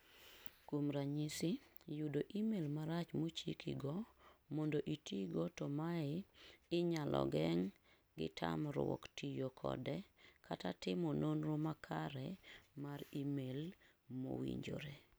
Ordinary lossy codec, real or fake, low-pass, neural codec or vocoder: none; real; none; none